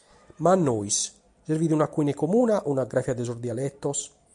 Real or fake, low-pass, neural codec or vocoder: real; 10.8 kHz; none